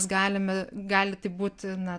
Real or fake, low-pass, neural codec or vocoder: real; 9.9 kHz; none